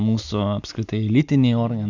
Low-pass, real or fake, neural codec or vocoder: 7.2 kHz; real; none